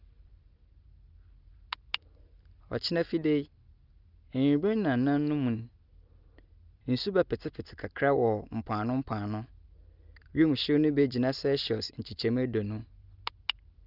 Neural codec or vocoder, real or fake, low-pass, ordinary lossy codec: none; real; 5.4 kHz; Opus, 32 kbps